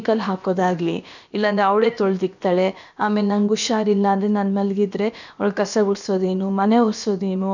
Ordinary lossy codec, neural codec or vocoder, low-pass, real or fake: none; codec, 16 kHz, about 1 kbps, DyCAST, with the encoder's durations; 7.2 kHz; fake